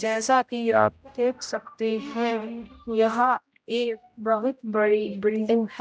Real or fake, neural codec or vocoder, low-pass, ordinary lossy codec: fake; codec, 16 kHz, 0.5 kbps, X-Codec, HuBERT features, trained on general audio; none; none